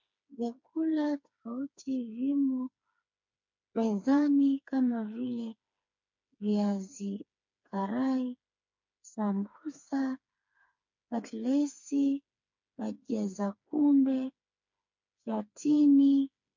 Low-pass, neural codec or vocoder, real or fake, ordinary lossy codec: 7.2 kHz; codec, 16 kHz, 4 kbps, FreqCodec, smaller model; fake; MP3, 48 kbps